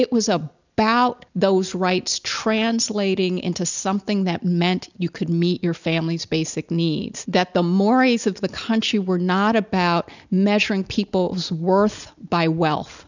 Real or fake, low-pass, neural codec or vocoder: real; 7.2 kHz; none